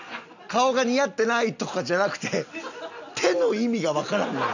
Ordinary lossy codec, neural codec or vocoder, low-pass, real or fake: none; none; 7.2 kHz; real